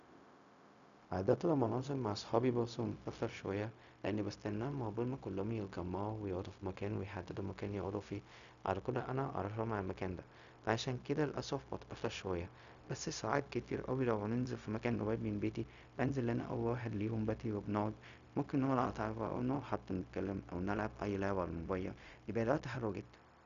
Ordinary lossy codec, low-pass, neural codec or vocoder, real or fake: none; 7.2 kHz; codec, 16 kHz, 0.4 kbps, LongCat-Audio-Codec; fake